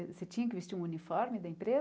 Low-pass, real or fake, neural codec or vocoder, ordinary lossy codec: none; real; none; none